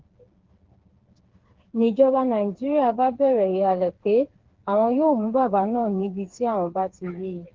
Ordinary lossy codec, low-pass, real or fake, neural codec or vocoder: Opus, 16 kbps; 7.2 kHz; fake; codec, 16 kHz, 4 kbps, FreqCodec, smaller model